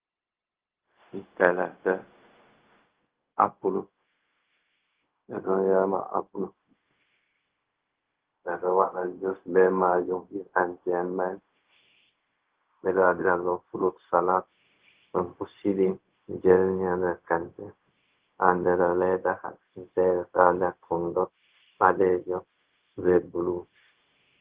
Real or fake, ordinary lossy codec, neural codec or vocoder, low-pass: fake; Opus, 16 kbps; codec, 16 kHz, 0.4 kbps, LongCat-Audio-Codec; 3.6 kHz